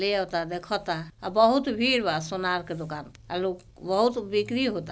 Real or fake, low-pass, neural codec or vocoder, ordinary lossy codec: real; none; none; none